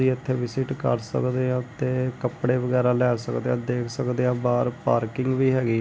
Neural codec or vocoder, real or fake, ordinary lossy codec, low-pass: none; real; none; none